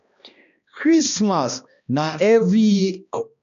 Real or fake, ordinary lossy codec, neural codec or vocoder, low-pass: fake; none; codec, 16 kHz, 1 kbps, X-Codec, HuBERT features, trained on balanced general audio; 7.2 kHz